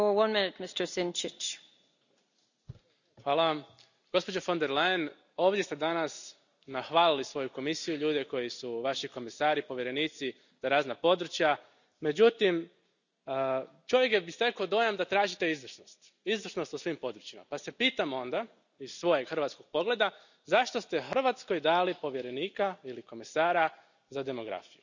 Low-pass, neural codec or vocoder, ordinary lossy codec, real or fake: 7.2 kHz; none; none; real